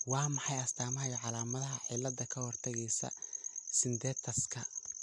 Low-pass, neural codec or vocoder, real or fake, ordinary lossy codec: 10.8 kHz; none; real; MP3, 64 kbps